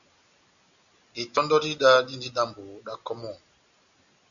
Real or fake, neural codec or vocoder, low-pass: real; none; 7.2 kHz